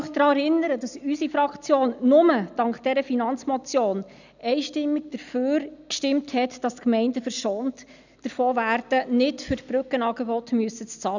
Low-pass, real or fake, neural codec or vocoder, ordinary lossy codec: 7.2 kHz; real; none; none